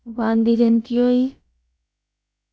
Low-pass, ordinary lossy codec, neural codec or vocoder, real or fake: none; none; codec, 16 kHz, about 1 kbps, DyCAST, with the encoder's durations; fake